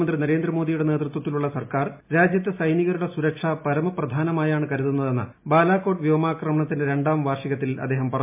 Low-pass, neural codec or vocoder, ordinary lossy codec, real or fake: 3.6 kHz; none; MP3, 32 kbps; real